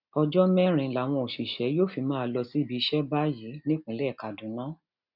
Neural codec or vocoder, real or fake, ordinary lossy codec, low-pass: none; real; none; 5.4 kHz